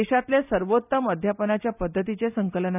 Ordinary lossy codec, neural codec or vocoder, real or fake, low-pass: none; none; real; 3.6 kHz